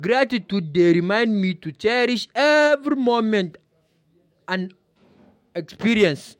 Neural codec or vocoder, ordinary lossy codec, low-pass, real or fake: autoencoder, 48 kHz, 128 numbers a frame, DAC-VAE, trained on Japanese speech; MP3, 64 kbps; 19.8 kHz; fake